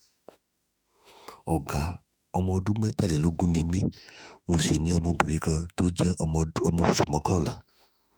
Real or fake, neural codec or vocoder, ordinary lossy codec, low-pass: fake; autoencoder, 48 kHz, 32 numbers a frame, DAC-VAE, trained on Japanese speech; none; none